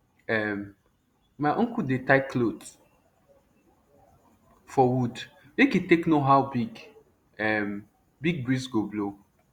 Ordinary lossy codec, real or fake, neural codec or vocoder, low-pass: Opus, 64 kbps; real; none; 19.8 kHz